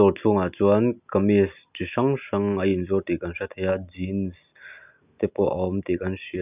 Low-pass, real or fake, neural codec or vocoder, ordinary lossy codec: 3.6 kHz; real; none; none